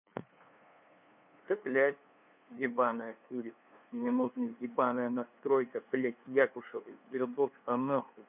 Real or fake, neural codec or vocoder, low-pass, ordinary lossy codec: fake; codec, 16 kHz, 2 kbps, FunCodec, trained on LibriTTS, 25 frames a second; 3.6 kHz; none